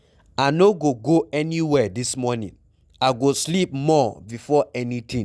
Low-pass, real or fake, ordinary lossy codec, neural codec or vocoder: none; real; none; none